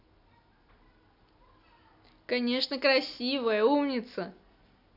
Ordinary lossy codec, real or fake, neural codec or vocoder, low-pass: none; real; none; 5.4 kHz